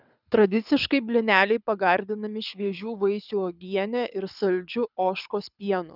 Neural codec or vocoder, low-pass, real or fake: codec, 24 kHz, 6 kbps, HILCodec; 5.4 kHz; fake